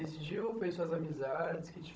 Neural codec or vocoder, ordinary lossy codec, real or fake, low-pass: codec, 16 kHz, 16 kbps, FunCodec, trained on Chinese and English, 50 frames a second; none; fake; none